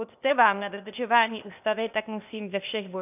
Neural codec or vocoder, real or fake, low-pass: codec, 16 kHz, 0.8 kbps, ZipCodec; fake; 3.6 kHz